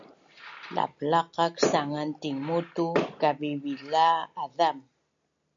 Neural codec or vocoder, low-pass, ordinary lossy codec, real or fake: none; 7.2 kHz; MP3, 64 kbps; real